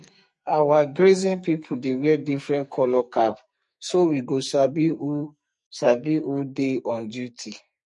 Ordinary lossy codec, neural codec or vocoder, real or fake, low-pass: MP3, 48 kbps; codec, 44.1 kHz, 2.6 kbps, SNAC; fake; 10.8 kHz